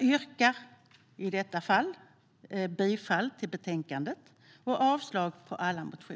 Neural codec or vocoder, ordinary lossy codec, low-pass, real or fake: none; none; none; real